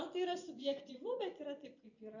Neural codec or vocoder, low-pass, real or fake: vocoder, 24 kHz, 100 mel bands, Vocos; 7.2 kHz; fake